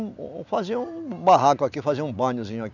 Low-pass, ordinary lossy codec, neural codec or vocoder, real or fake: 7.2 kHz; none; none; real